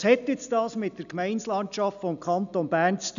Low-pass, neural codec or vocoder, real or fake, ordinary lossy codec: 7.2 kHz; none; real; none